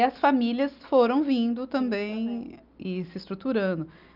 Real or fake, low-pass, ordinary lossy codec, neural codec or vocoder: real; 5.4 kHz; Opus, 24 kbps; none